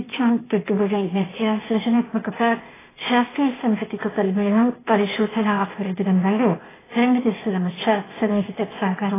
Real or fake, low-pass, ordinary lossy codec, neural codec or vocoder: fake; 3.6 kHz; AAC, 16 kbps; codec, 16 kHz, 0.8 kbps, ZipCodec